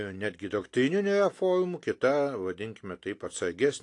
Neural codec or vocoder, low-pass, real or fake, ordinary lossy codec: none; 10.8 kHz; real; AAC, 48 kbps